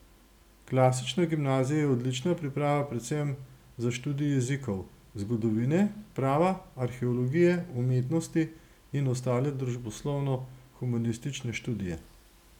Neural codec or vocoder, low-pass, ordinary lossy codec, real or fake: none; 19.8 kHz; none; real